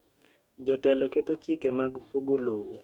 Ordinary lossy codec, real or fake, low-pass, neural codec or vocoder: none; fake; 19.8 kHz; codec, 44.1 kHz, 2.6 kbps, DAC